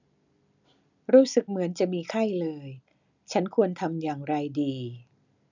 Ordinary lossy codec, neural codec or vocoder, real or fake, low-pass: none; none; real; 7.2 kHz